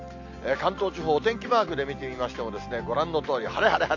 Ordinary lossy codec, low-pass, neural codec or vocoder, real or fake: AAC, 48 kbps; 7.2 kHz; none; real